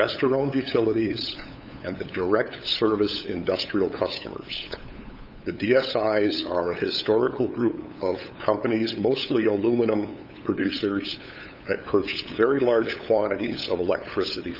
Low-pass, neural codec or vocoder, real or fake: 5.4 kHz; codec, 16 kHz, 8 kbps, FunCodec, trained on LibriTTS, 25 frames a second; fake